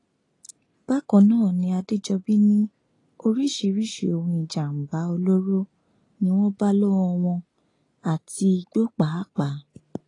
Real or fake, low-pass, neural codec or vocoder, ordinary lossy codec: real; 10.8 kHz; none; AAC, 32 kbps